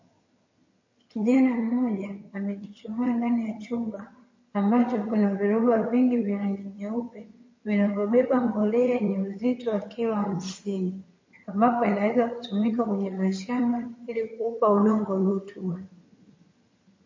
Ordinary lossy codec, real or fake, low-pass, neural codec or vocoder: MP3, 32 kbps; fake; 7.2 kHz; vocoder, 22.05 kHz, 80 mel bands, HiFi-GAN